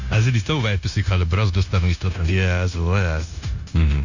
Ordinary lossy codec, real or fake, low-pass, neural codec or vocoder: none; fake; 7.2 kHz; codec, 16 kHz, 0.9 kbps, LongCat-Audio-Codec